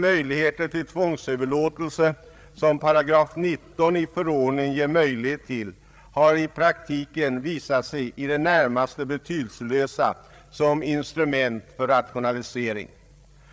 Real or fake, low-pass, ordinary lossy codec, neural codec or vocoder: fake; none; none; codec, 16 kHz, 8 kbps, FreqCodec, larger model